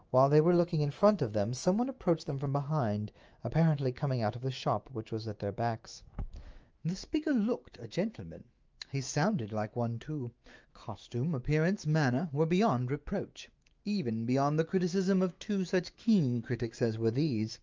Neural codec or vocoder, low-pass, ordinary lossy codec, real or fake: autoencoder, 48 kHz, 128 numbers a frame, DAC-VAE, trained on Japanese speech; 7.2 kHz; Opus, 24 kbps; fake